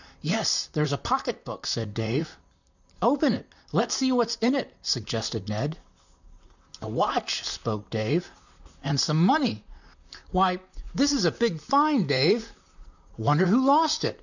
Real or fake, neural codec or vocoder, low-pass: fake; vocoder, 44.1 kHz, 128 mel bands, Pupu-Vocoder; 7.2 kHz